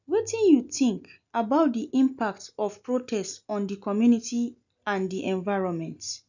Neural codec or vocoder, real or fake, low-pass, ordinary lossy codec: none; real; 7.2 kHz; none